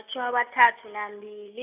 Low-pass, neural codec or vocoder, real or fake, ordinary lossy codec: 3.6 kHz; none; real; AAC, 32 kbps